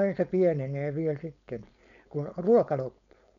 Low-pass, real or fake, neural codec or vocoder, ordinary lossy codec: 7.2 kHz; fake; codec, 16 kHz, 4.8 kbps, FACodec; MP3, 96 kbps